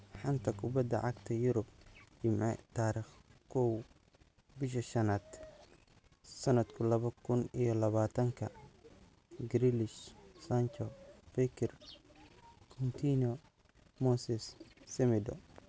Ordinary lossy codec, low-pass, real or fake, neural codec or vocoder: none; none; real; none